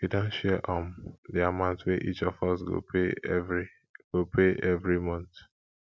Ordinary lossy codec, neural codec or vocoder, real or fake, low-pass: none; none; real; none